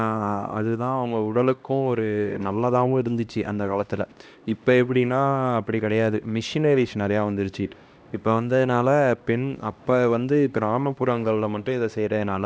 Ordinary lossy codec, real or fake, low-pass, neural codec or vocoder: none; fake; none; codec, 16 kHz, 1 kbps, X-Codec, HuBERT features, trained on LibriSpeech